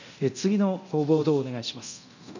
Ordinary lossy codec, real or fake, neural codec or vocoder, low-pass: none; fake; codec, 24 kHz, 0.9 kbps, DualCodec; 7.2 kHz